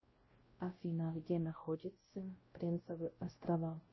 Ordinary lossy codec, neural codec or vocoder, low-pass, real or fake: MP3, 24 kbps; codec, 16 kHz, 0.5 kbps, X-Codec, WavLM features, trained on Multilingual LibriSpeech; 7.2 kHz; fake